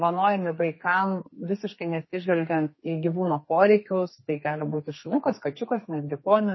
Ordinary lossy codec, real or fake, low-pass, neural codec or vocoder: MP3, 24 kbps; fake; 7.2 kHz; codec, 32 kHz, 1.9 kbps, SNAC